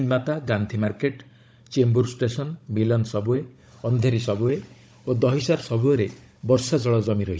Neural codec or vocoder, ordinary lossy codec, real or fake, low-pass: codec, 16 kHz, 16 kbps, FunCodec, trained on Chinese and English, 50 frames a second; none; fake; none